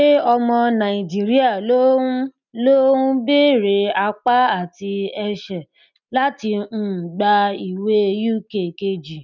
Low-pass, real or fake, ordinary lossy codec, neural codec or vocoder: 7.2 kHz; real; none; none